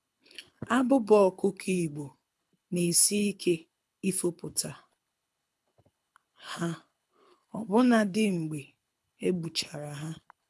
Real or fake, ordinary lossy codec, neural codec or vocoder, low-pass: fake; none; codec, 24 kHz, 6 kbps, HILCodec; none